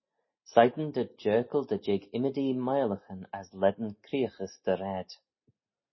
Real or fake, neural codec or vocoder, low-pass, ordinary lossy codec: real; none; 7.2 kHz; MP3, 24 kbps